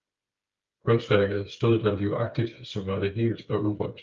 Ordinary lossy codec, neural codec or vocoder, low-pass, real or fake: Opus, 32 kbps; codec, 16 kHz, 8 kbps, FreqCodec, smaller model; 7.2 kHz; fake